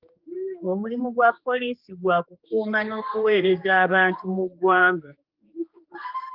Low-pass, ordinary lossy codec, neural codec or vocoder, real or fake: 5.4 kHz; Opus, 32 kbps; codec, 16 kHz, 2 kbps, X-Codec, HuBERT features, trained on general audio; fake